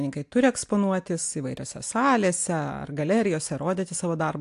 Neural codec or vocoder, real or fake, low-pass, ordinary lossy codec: none; real; 10.8 kHz; AAC, 64 kbps